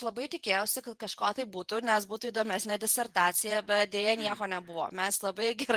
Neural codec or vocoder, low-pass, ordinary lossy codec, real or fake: vocoder, 48 kHz, 128 mel bands, Vocos; 14.4 kHz; Opus, 32 kbps; fake